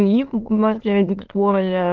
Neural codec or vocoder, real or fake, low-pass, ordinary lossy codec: autoencoder, 22.05 kHz, a latent of 192 numbers a frame, VITS, trained on many speakers; fake; 7.2 kHz; Opus, 16 kbps